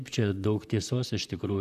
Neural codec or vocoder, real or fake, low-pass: vocoder, 44.1 kHz, 128 mel bands, Pupu-Vocoder; fake; 14.4 kHz